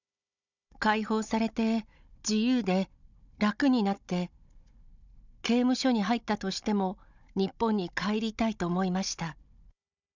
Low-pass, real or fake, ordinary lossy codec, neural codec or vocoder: 7.2 kHz; fake; none; codec, 16 kHz, 16 kbps, FunCodec, trained on Chinese and English, 50 frames a second